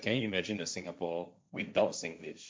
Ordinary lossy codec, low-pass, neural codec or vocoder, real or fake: none; none; codec, 16 kHz, 1.1 kbps, Voila-Tokenizer; fake